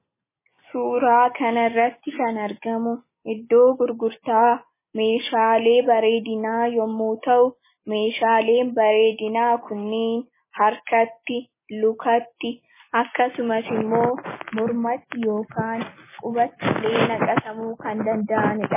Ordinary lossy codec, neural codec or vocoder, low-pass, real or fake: MP3, 16 kbps; none; 3.6 kHz; real